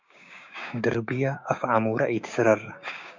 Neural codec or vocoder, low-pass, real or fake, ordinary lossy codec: codec, 16 kHz, 6 kbps, DAC; 7.2 kHz; fake; MP3, 64 kbps